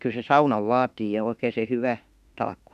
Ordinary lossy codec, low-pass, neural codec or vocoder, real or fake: none; 14.4 kHz; autoencoder, 48 kHz, 32 numbers a frame, DAC-VAE, trained on Japanese speech; fake